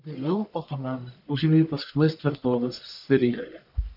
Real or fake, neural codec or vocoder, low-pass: fake; codec, 44.1 kHz, 1.7 kbps, Pupu-Codec; 5.4 kHz